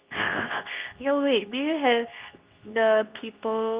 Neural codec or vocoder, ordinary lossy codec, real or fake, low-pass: codec, 24 kHz, 0.9 kbps, WavTokenizer, medium speech release version 2; Opus, 24 kbps; fake; 3.6 kHz